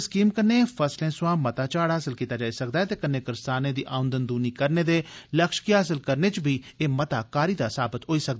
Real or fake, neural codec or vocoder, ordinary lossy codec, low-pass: real; none; none; none